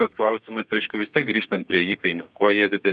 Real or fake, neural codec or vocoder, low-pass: fake; codec, 32 kHz, 1.9 kbps, SNAC; 9.9 kHz